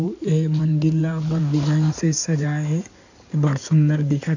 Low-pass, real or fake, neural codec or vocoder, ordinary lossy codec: 7.2 kHz; fake; codec, 16 kHz in and 24 kHz out, 2.2 kbps, FireRedTTS-2 codec; none